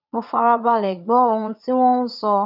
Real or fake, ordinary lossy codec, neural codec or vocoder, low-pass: fake; none; vocoder, 22.05 kHz, 80 mel bands, Vocos; 5.4 kHz